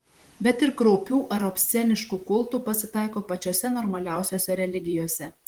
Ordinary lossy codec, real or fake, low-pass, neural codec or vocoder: Opus, 24 kbps; fake; 19.8 kHz; vocoder, 44.1 kHz, 128 mel bands, Pupu-Vocoder